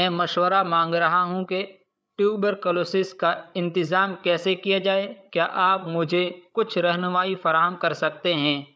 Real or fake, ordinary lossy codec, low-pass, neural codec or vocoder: fake; none; none; codec, 16 kHz, 8 kbps, FreqCodec, larger model